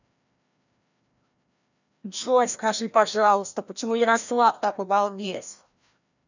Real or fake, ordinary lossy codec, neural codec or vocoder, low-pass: fake; none; codec, 16 kHz, 1 kbps, FreqCodec, larger model; 7.2 kHz